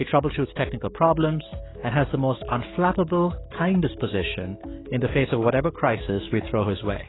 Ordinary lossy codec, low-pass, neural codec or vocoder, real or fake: AAC, 16 kbps; 7.2 kHz; codec, 44.1 kHz, 7.8 kbps, DAC; fake